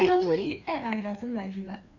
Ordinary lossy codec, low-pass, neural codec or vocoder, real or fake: none; 7.2 kHz; codec, 16 kHz, 2 kbps, FreqCodec, larger model; fake